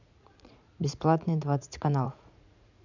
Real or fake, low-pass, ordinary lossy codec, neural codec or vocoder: fake; 7.2 kHz; none; vocoder, 44.1 kHz, 80 mel bands, Vocos